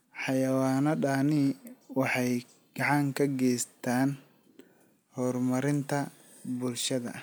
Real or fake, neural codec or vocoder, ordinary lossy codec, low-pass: real; none; none; none